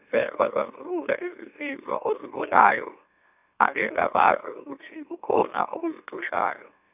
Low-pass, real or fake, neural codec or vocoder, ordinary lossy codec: 3.6 kHz; fake; autoencoder, 44.1 kHz, a latent of 192 numbers a frame, MeloTTS; none